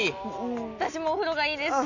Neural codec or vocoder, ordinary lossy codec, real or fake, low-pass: none; none; real; 7.2 kHz